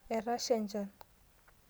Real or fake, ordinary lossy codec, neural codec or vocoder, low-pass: real; none; none; none